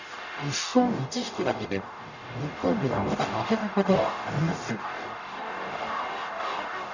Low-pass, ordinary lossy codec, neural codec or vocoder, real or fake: 7.2 kHz; none; codec, 44.1 kHz, 0.9 kbps, DAC; fake